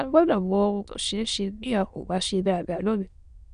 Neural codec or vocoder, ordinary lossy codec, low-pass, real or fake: autoencoder, 22.05 kHz, a latent of 192 numbers a frame, VITS, trained on many speakers; none; 9.9 kHz; fake